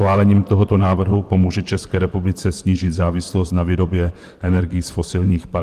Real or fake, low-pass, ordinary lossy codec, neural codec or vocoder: fake; 14.4 kHz; Opus, 24 kbps; vocoder, 44.1 kHz, 128 mel bands, Pupu-Vocoder